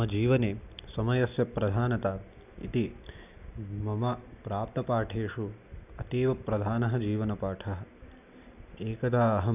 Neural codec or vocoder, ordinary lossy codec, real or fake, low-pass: none; none; real; 3.6 kHz